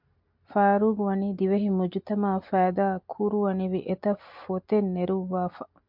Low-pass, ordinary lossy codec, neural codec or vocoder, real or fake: 5.4 kHz; MP3, 48 kbps; none; real